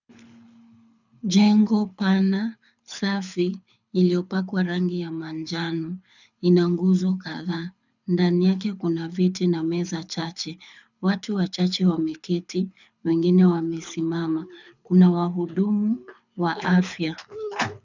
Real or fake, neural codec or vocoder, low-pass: fake; codec, 24 kHz, 6 kbps, HILCodec; 7.2 kHz